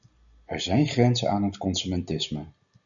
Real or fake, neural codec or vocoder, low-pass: real; none; 7.2 kHz